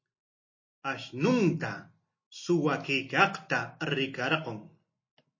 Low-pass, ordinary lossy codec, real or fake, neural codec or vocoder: 7.2 kHz; MP3, 32 kbps; real; none